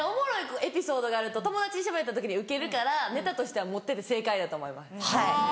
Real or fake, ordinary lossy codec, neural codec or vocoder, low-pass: real; none; none; none